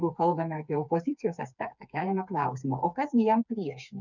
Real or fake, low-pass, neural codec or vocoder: fake; 7.2 kHz; codec, 16 kHz, 4 kbps, FreqCodec, smaller model